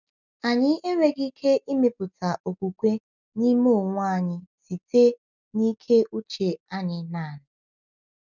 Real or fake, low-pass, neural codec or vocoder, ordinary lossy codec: real; 7.2 kHz; none; none